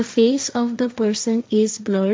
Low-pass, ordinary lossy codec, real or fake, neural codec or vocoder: none; none; fake; codec, 16 kHz, 1.1 kbps, Voila-Tokenizer